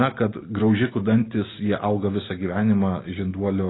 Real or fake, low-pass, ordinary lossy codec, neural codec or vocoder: real; 7.2 kHz; AAC, 16 kbps; none